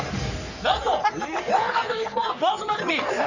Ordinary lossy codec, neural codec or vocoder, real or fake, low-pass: none; codec, 44.1 kHz, 3.4 kbps, Pupu-Codec; fake; 7.2 kHz